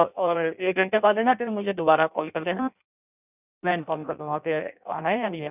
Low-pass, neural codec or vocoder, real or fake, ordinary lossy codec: 3.6 kHz; codec, 16 kHz in and 24 kHz out, 0.6 kbps, FireRedTTS-2 codec; fake; none